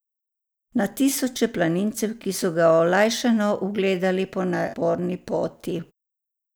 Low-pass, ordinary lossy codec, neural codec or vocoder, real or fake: none; none; none; real